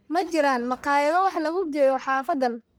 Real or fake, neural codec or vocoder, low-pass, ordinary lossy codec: fake; codec, 44.1 kHz, 1.7 kbps, Pupu-Codec; none; none